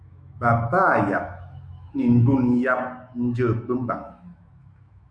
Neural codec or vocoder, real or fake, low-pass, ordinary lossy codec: autoencoder, 48 kHz, 128 numbers a frame, DAC-VAE, trained on Japanese speech; fake; 9.9 kHz; Opus, 64 kbps